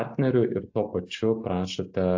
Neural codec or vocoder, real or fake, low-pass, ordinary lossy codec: none; real; 7.2 kHz; AAC, 48 kbps